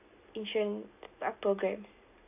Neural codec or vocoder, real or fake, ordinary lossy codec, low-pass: none; real; AAC, 32 kbps; 3.6 kHz